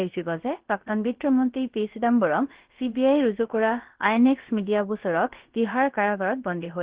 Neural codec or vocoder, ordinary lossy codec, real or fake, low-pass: codec, 16 kHz, about 1 kbps, DyCAST, with the encoder's durations; Opus, 16 kbps; fake; 3.6 kHz